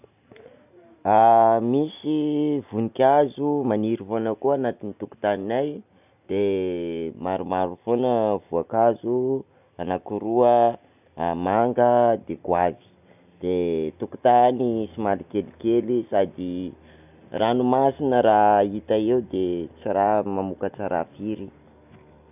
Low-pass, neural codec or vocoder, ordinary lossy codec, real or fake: 3.6 kHz; none; none; real